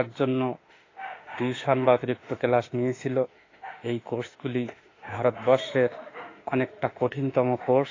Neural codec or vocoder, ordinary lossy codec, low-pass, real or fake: autoencoder, 48 kHz, 32 numbers a frame, DAC-VAE, trained on Japanese speech; AAC, 32 kbps; 7.2 kHz; fake